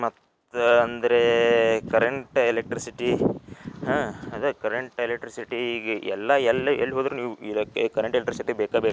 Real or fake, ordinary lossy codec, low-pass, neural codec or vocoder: real; none; none; none